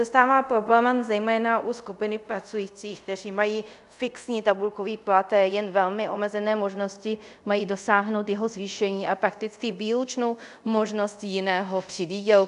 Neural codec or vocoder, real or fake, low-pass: codec, 24 kHz, 0.5 kbps, DualCodec; fake; 10.8 kHz